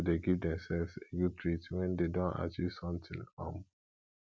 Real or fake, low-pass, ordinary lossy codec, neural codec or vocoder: real; none; none; none